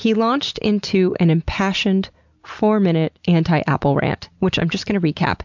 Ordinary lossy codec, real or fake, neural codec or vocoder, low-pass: MP3, 64 kbps; real; none; 7.2 kHz